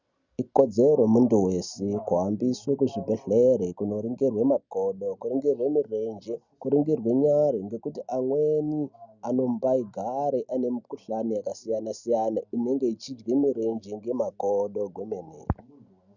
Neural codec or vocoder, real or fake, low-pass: none; real; 7.2 kHz